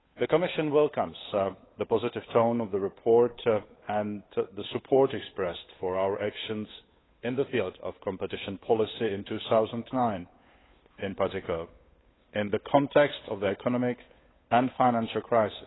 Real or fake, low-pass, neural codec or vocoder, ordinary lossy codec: fake; 7.2 kHz; codec, 16 kHz, 8 kbps, FunCodec, trained on Chinese and English, 25 frames a second; AAC, 16 kbps